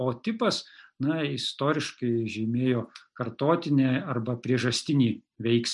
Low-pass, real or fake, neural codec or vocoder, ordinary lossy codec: 10.8 kHz; real; none; MP3, 96 kbps